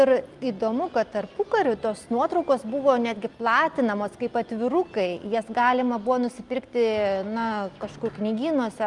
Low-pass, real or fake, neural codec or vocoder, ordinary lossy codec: 10.8 kHz; real; none; Opus, 32 kbps